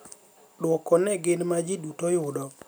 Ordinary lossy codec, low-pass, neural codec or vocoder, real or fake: none; none; none; real